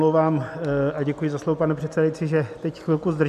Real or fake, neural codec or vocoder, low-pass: real; none; 14.4 kHz